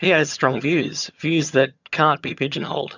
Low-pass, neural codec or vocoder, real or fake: 7.2 kHz; vocoder, 22.05 kHz, 80 mel bands, HiFi-GAN; fake